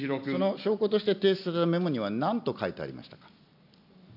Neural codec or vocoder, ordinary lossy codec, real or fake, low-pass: none; none; real; 5.4 kHz